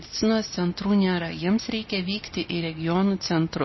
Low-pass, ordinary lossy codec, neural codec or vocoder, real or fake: 7.2 kHz; MP3, 24 kbps; none; real